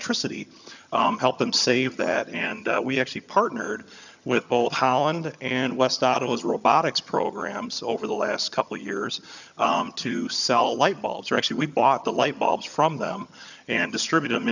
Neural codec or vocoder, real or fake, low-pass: vocoder, 22.05 kHz, 80 mel bands, HiFi-GAN; fake; 7.2 kHz